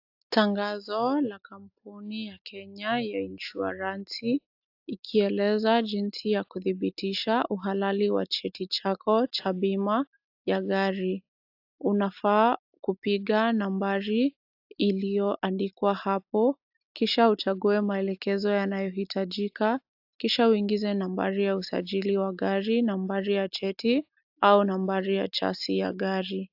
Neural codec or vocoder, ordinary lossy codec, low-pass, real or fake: none; AAC, 48 kbps; 5.4 kHz; real